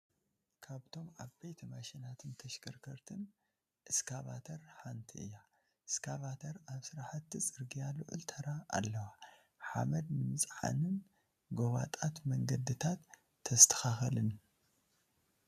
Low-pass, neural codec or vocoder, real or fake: 14.4 kHz; none; real